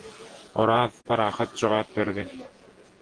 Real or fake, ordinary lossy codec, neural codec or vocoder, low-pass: fake; Opus, 16 kbps; vocoder, 48 kHz, 128 mel bands, Vocos; 9.9 kHz